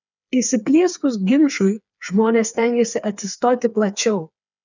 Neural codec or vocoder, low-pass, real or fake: codec, 16 kHz, 4 kbps, FreqCodec, smaller model; 7.2 kHz; fake